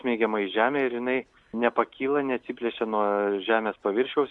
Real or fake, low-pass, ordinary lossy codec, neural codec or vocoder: real; 10.8 kHz; Opus, 64 kbps; none